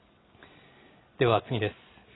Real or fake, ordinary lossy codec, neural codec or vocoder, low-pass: real; AAC, 16 kbps; none; 7.2 kHz